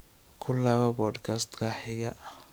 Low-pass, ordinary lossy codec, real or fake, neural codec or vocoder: none; none; fake; codec, 44.1 kHz, 7.8 kbps, DAC